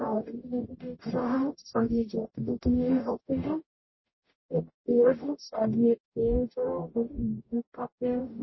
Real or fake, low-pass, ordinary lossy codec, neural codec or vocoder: fake; 7.2 kHz; MP3, 24 kbps; codec, 44.1 kHz, 0.9 kbps, DAC